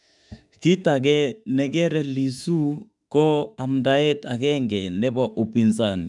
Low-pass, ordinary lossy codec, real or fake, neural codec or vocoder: 10.8 kHz; none; fake; autoencoder, 48 kHz, 32 numbers a frame, DAC-VAE, trained on Japanese speech